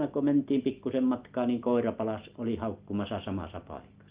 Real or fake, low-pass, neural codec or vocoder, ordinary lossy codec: real; 3.6 kHz; none; Opus, 32 kbps